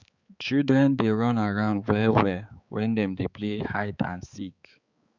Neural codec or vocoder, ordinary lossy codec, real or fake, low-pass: codec, 16 kHz, 4 kbps, X-Codec, HuBERT features, trained on balanced general audio; Opus, 64 kbps; fake; 7.2 kHz